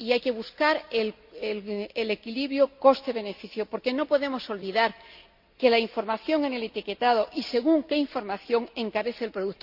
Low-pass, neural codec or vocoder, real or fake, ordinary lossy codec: 5.4 kHz; none; real; Opus, 64 kbps